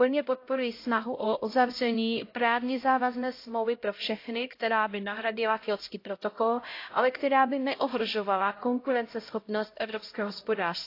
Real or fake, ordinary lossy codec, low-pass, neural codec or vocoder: fake; AAC, 32 kbps; 5.4 kHz; codec, 16 kHz, 0.5 kbps, X-Codec, HuBERT features, trained on LibriSpeech